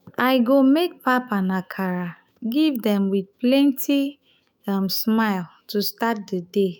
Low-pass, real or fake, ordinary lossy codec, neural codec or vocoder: none; fake; none; autoencoder, 48 kHz, 128 numbers a frame, DAC-VAE, trained on Japanese speech